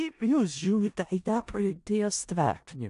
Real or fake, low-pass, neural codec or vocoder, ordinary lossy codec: fake; 10.8 kHz; codec, 16 kHz in and 24 kHz out, 0.4 kbps, LongCat-Audio-Codec, four codebook decoder; AAC, 64 kbps